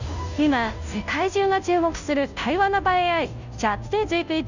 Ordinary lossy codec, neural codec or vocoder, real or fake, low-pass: none; codec, 16 kHz, 0.5 kbps, FunCodec, trained on Chinese and English, 25 frames a second; fake; 7.2 kHz